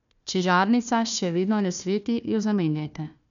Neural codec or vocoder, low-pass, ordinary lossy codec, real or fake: codec, 16 kHz, 1 kbps, FunCodec, trained on Chinese and English, 50 frames a second; 7.2 kHz; none; fake